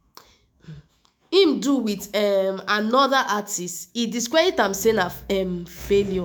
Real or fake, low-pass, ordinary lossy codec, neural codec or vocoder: fake; none; none; autoencoder, 48 kHz, 128 numbers a frame, DAC-VAE, trained on Japanese speech